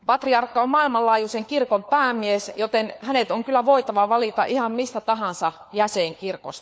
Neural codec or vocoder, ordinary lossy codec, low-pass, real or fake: codec, 16 kHz, 4 kbps, FunCodec, trained on LibriTTS, 50 frames a second; none; none; fake